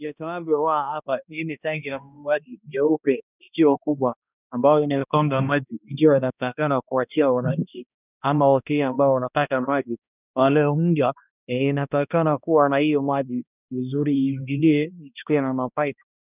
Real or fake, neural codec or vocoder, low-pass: fake; codec, 16 kHz, 1 kbps, X-Codec, HuBERT features, trained on balanced general audio; 3.6 kHz